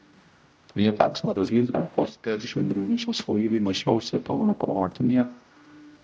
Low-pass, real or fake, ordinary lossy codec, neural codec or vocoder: none; fake; none; codec, 16 kHz, 0.5 kbps, X-Codec, HuBERT features, trained on general audio